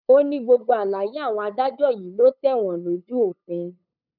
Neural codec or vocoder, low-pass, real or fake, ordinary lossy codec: codec, 16 kHz, 8 kbps, FunCodec, trained on LibriTTS, 25 frames a second; 5.4 kHz; fake; none